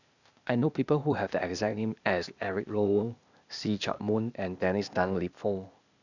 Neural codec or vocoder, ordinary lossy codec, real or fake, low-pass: codec, 16 kHz, 0.8 kbps, ZipCodec; none; fake; 7.2 kHz